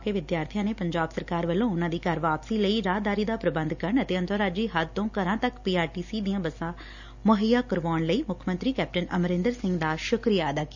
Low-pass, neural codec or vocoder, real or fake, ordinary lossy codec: 7.2 kHz; none; real; none